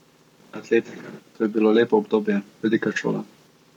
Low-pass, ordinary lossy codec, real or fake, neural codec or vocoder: 19.8 kHz; none; fake; codec, 44.1 kHz, 7.8 kbps, Pupu-Codec